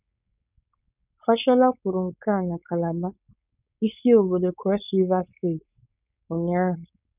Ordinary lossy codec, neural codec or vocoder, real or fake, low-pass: none; codec, 16 kHz, 4.8 kbps, FACodec; fake; 3.6 kHz